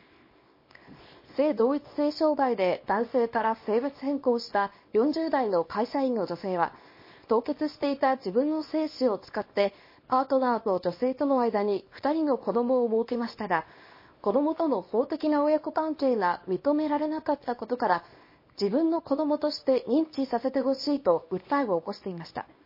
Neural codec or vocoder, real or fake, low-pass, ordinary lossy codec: codec, 24 kHz, 0.9 kbps, WavTokenizer, small release; fake; 5.4 kHz; MP3, 24 kbps